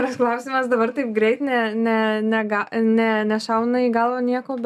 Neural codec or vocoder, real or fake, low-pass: none; real; 14.4 kHz